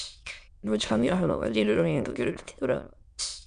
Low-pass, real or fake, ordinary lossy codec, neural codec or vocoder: 9.9 kHz; fake; MP3, 96 kbps; autoencoder, 22.05 kHz, a latent of 192 numbers a frame, VITS, trained on many speakers